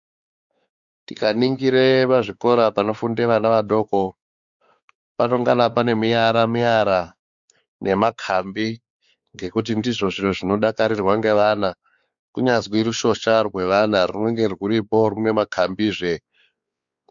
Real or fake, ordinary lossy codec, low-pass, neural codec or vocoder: fake; Opus, 64 kbps; 7.2 kHz; codec, 16 kHz, 4 kbps, X-Codec, WavLM features, trained on Multilingual LibriSpeech